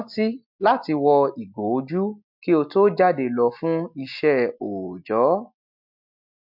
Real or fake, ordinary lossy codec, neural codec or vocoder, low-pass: real; MP3, 48 kbps; none; 5.4 kHz